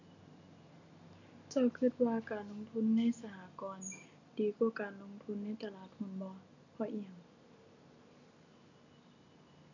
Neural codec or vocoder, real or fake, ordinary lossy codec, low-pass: none; real; none; 7.2 kHz